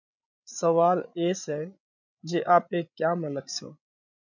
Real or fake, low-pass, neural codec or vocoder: fake; 7.2 kHz; codec, 16 kHz, 16 kbps, FreqCodec, larger model